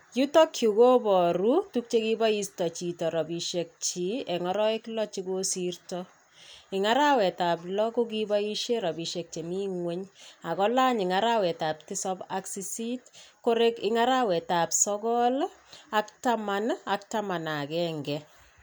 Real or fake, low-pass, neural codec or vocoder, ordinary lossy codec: real; none; none; none